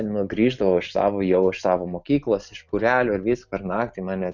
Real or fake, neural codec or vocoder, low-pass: real; none; 7.2 kHz